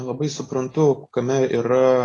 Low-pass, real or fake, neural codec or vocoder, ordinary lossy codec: 10.8 kHz; real; none; AAC, 32 kbps